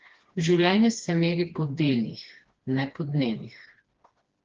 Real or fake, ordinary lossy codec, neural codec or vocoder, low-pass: fake; Opus, 16 kbps; codec, 16 kHz, 2 kbps, FreqCodec, smaller model; 7.2 kHz